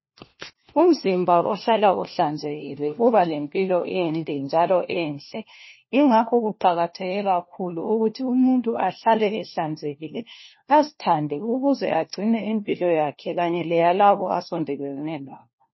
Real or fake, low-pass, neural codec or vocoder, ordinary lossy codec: fake; 7.2 kHz; codec, 16 kHz, 1 kbps, FunCodec, trained on LibriTTS, 50 frames a second; MP3, 24 kbps